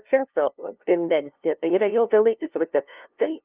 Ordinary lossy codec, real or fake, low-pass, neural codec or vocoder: Opus, 24 kbps; fake; 3.6 kHz; codec, 16 kHz, 0.5 kbps, FunCodec, trained on LibriTTS, 25 frames a second